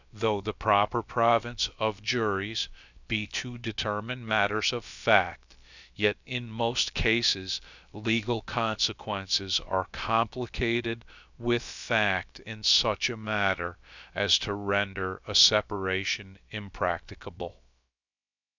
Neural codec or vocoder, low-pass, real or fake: codec, 16 kHz, about 1 kbps, DyCAST, with the encoder's durations; 7.2 kHz; fake